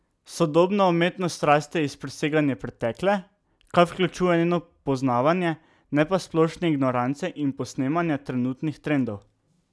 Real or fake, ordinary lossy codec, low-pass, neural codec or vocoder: real; none; none; none